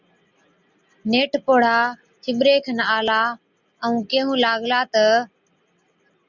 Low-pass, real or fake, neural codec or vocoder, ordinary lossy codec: 7.2 kHz; real; none; Opus, 64 kbps